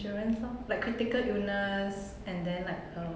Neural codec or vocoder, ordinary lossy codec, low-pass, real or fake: none; none; none; real